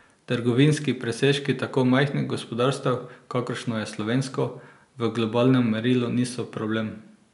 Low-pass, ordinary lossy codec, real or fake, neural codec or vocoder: 10.8 kHz; none; real; none